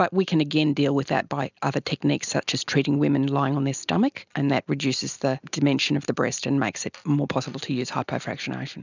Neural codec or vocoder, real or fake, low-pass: none; real; 7.2 kHz